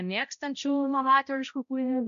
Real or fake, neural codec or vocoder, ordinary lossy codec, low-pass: fake; codec, 16 kHz, 0.5 kbps, X-Codec, HuBERT features, trained on balanced general audio; MP3, 96 kbps; 7.2 kHz